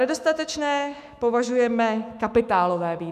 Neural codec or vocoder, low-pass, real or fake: autoencoder, 48 kHz, 128 numbers a frame, DAC-VAE, trained on Japanese speech; 14.4 kHz; fake